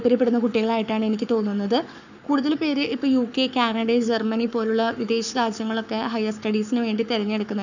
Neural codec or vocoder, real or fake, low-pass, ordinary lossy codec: codec, 44.1 kHz, 7.8 kbps, Pupu-Codec; fake; 7.2 kHz; none